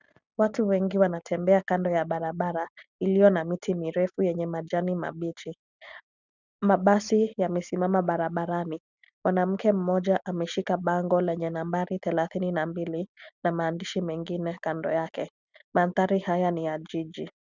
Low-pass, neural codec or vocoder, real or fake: 7.2 kHz; none; real